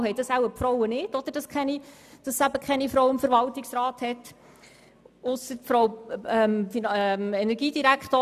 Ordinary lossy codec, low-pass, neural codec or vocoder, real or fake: none; 14.4 kHz; none; real